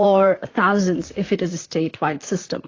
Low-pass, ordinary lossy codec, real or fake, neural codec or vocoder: 7.2 kHz; AAC, 32 kbps; fake; vocoder, 44.1 kHz, 128 mel bands, Pupu-Vocoder